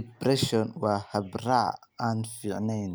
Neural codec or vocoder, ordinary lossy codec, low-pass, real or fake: none; none; none; real